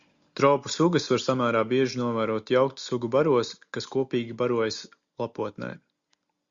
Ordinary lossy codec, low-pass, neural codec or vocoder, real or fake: Opus, 64 kbps; 7.2 kHz; none; real